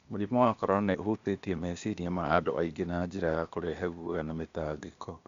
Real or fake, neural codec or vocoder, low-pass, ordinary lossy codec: fake; codec, 16 kHz, 0.8 kbps, ZipCodec; 7.2 kHz; Opus, 64 kbps